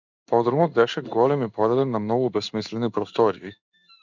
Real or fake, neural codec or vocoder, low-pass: fake; codec, 16 kHz in and 24 kHz out, 1 kbps, XY-Tokenizer; 7.2 kHz